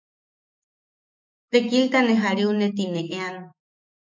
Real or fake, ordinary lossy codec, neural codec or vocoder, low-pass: fake; MP3, 48 kbps; autoencoder, 48 kHz, 128 numbers a frame, DAC-VAE, trained on Japanese speech; 7.2 kHz